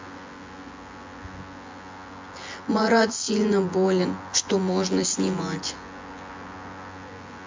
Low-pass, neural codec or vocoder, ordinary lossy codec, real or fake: 7.2 kHz; vocoder, 24 kHz, 100 mel bands, Vocos; MP3, 48 kbps; fake